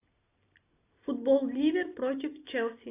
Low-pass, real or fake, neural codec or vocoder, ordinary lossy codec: 3.6 kHz; real; none; AAC, 24 kbps